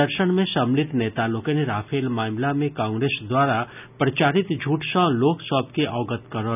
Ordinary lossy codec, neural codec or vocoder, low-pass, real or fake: none; none; 3.6 kHz; real